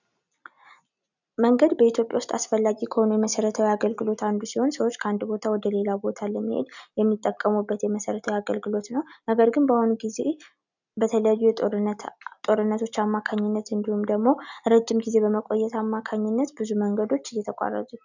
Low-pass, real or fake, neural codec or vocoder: 7.2 kHz; real; none